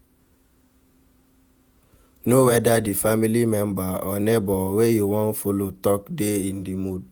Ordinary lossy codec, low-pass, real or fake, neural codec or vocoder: none; none; fake; vocoder, 48 kHz, 128 mel bands, Vocos